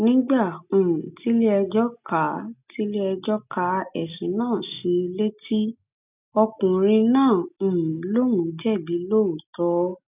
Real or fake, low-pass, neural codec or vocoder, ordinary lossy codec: real; 3.6 kHz; none; none